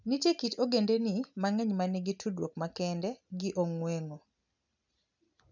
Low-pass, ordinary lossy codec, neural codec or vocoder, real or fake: 7.2 kHz; none; none; real